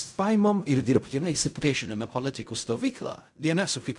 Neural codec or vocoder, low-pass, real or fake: codec, 16 kHz in and 24 kHz out, 0.4 kbps, LongCat-Audio-Codec, fine tuned four codebook decoder; 10.8 kHz; fake